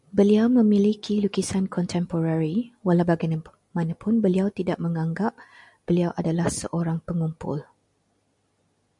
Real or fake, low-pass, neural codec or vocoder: real; 10.8 kHz; none